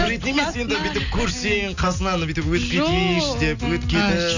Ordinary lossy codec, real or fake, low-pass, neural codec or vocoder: none; real; 7.2 kHz; none